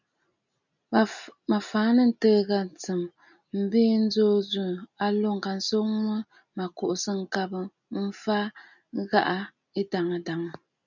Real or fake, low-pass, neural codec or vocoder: real; 7.2 kHz; none